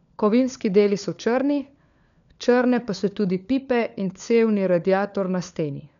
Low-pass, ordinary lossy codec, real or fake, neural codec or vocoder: 7.2 kHz; none; fake; codec, 16 kHz, 4 kbps, FunCodec, trained on LibriTTS, 50 frames a second